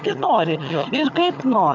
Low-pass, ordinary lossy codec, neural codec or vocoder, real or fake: 7.2 kHz; MP3, 64 kbps; vocoder, 22.05 kHz, 80 mel bands, HiFi-GAN; fake